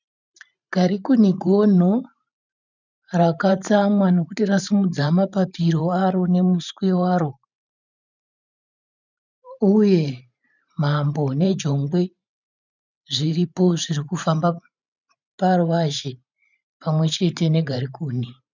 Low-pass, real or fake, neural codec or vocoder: 7.2 kHz; fake; vocoder, 44.1 kHz, 128 mel bands every 512 samples, BigVGAN v2